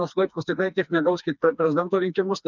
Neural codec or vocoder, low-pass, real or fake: codec, 16 kHz, 2 kbps, FreqCodec, smaller model; 7.2 kHz; fake